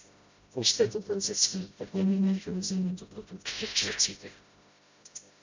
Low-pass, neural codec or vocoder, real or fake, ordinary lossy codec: 7.2 kHz; codec, 16 kHz, 0.5 kbps, FreqCodec, smaller model; fake; MP3, 64 kbps